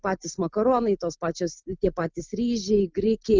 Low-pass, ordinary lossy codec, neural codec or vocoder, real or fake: 7.2 kHz; Opus, 24 kbps; none; real